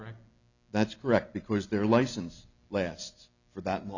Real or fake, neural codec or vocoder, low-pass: real; none; 7.2 kHz